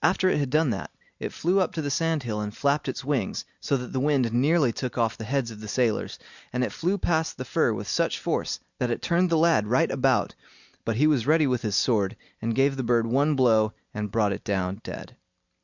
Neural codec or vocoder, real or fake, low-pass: none; real; 7.2 kHz